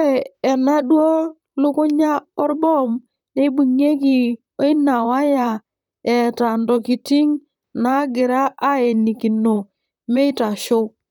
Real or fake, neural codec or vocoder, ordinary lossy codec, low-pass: fake; vocoder, 44.1 kHz, 128 mel bands, Pupu-Vocoder; none; 19.8 kHz